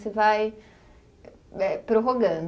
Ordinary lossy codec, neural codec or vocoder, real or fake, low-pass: none; none; real; none